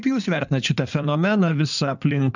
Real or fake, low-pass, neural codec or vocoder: fake; 7.2 kHz; codec, 16 kHz in and 24 kHz out, 2.2 kbps, FireRedTTS-2 codec